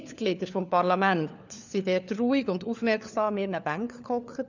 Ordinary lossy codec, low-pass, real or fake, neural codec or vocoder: none; 7.2 kHz; fake; codec, 16 kHz, 4 kbps, FunCodec, trained on LibriTTS, 50 frames a second